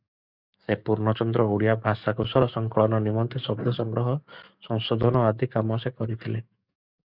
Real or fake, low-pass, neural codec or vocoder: fake; 5.4 kHz; codec, 44.1 kHz, 7.8 kbps, DAC